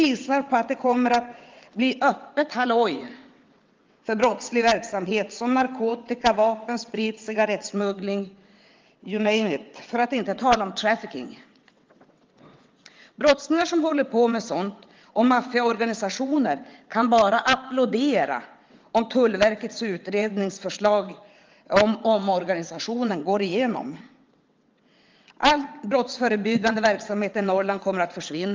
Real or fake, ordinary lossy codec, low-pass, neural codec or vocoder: fake; Opus, 32 kbps; 7.2 kHz; vocoder, 22.05 kHz, 80 mel bands, WaveNeXt